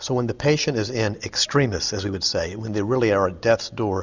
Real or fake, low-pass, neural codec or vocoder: real; 7.2 kHz; none